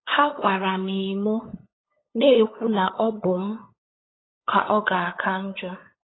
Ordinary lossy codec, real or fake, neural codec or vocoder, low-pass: AAC, 16 kbps; fake; codec, 16 kHz, 8 kbps, FunCodec, trained on LibriTTS, 25 frames a second; 7.2 kHz